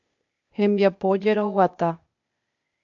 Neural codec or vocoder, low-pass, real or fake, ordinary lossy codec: codec, 16 kHz, 0.8 kbps, ZipCodec; 7.2 kHz; fake; MP3, 64 kbps